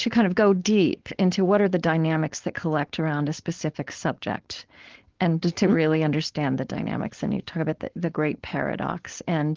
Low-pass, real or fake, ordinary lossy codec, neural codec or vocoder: 7.2 kHz; fake; Opus, 16 kbps; codec, 16 kHz, 4.8 kbps, FACodec